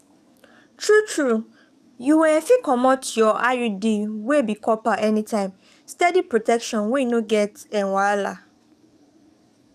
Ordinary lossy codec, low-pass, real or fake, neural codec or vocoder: none; 14.4 kHz; fake; codec, 44.1 kHz, 7.8 kbps, DAC